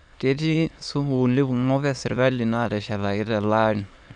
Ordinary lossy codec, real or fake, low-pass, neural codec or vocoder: none; fake; 9.9 kHz; autoencoder, 22.05 kHz, a latent of 192 numbers a frame, VITS, trained on many speakers